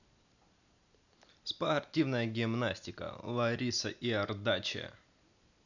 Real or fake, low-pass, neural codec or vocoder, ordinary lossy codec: real; 7.2 kHz; none; none